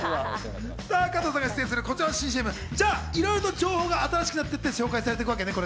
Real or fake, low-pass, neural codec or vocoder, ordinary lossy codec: real; none; none; none